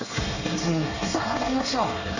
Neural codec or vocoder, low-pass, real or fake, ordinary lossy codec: codec, 24 kHz, 1 kbps, SNAC; 7.2 kHz; fake; none